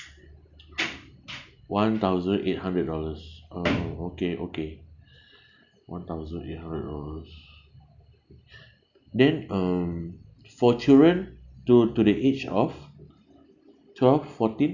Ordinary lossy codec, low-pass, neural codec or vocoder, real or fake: none; 7.2 kHz; none; real